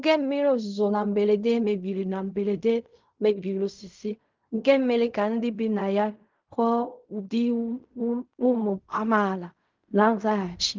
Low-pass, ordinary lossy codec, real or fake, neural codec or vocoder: 7.2 kHz; Opus, 32 kbps; fake; codec, 16 kHz in and 24 kHz out, 0.4 kbps, LongCat-Audio-Codec, fine tuned four codebook decoder